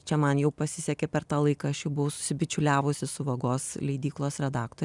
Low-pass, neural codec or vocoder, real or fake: 10.8 kHz; none; real